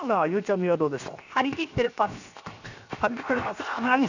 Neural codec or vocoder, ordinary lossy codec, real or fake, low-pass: codec, 16 kHz, 0.7 kbps, FocalCodec; none; fake; 7.2 kHz